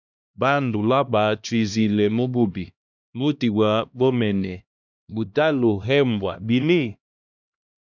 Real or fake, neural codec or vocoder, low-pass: fake; codec, 16 kHz, 1 kbps, X-Codec, HuBERT features, trained on LibriSpeech; 7.2 kHz